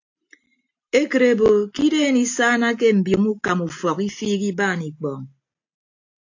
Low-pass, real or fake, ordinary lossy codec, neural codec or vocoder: 7.2 kHz; real; AAC, 48 kbps; none